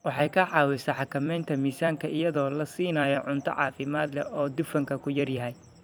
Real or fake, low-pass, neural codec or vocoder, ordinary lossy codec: fake; none; vocoder, 44.1 kHz, 128 mel bands every 256 samples, BigVGAN v2; none